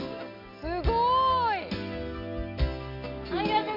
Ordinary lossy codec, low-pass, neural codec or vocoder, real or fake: MP3, 48 kbps; 5.4 kHz; none; real